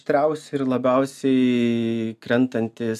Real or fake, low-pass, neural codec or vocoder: real; 14.4 kHz; none